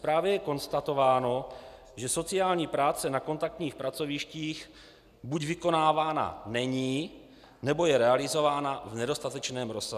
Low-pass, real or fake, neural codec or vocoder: 14.4 kHz; real; none